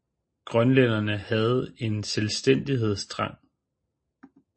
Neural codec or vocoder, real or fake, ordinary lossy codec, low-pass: none; real; MP3, 32 kbps; 9.9 kHz